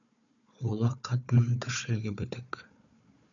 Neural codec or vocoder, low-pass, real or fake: codec, 16 kHz, 4 kbps, FunCodec, trained on Chinese and English, 50 frames a second; 7.2 kHz; fake